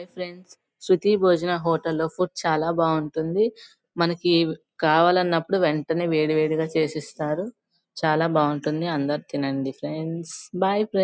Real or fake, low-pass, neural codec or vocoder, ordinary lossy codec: real; none; none; none